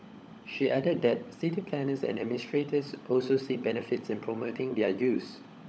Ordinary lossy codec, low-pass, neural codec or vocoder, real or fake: none; none; codec, 16 kHz, 16 kbps, FunCodec, trained on LibriTTS, 50 frames a second; fake